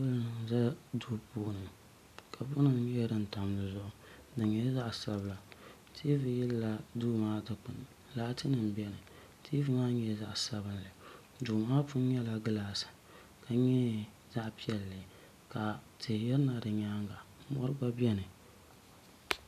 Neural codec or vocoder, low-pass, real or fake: none; 14.4 kHz; real